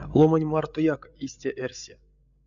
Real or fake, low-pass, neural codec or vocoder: fake; 7.2 kHz; codec, 16 kHz, 8 kbps, FreqCodec, larger model